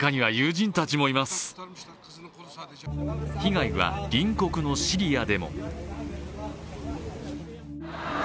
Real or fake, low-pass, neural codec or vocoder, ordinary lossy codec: real; none; none; none